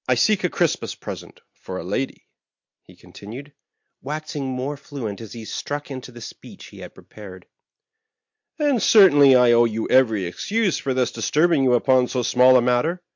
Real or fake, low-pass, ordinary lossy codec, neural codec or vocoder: real; 7.2 kHz; MP3, 48 kbps; none